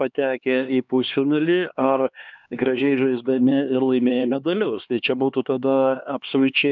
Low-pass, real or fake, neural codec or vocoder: 7.2 kHz; fake; codec, 16 kHz, 2 kbps, X-Codec, HuBERT features, trained on LibriSpeech